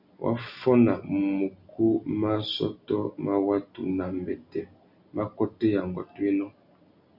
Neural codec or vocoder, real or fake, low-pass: none; real; 5.4 kHz